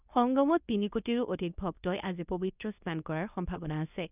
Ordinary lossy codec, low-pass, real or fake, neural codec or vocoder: none; 3.6 kHz; fake; codec, 24 kHz, 0.9 kbps, WavTokenizer, medium speech release version 2